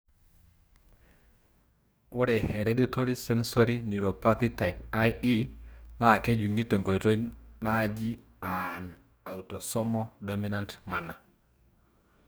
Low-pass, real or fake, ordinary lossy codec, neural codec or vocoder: none; fake; none; codec, 44.1 kHz, 2.6 kbps, DAC